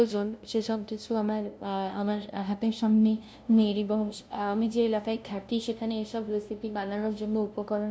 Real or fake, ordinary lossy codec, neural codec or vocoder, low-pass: fake; none; codec, 16 kHz, 0.5 kbps, FunCodec, trained on LibriTTS, 25 frames a second; none